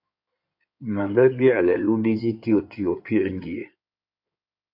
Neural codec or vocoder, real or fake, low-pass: codec, 16 kHz in and 24 kHz out, 2.2 kbps, FireRedTTS-2 codec; fake; 5.4 kHz